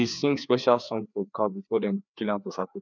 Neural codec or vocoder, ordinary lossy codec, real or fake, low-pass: codec, 16 kHz, 2 kbps, FreqCodec, larger model; none; fake; 7.2 kHz